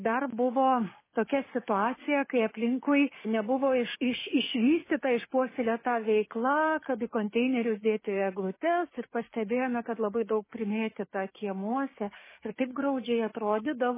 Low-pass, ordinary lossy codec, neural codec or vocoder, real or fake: 3.6 kHz; MP3, 16 kbps; codec, 16 kHz, 6 kbps, DAC; fake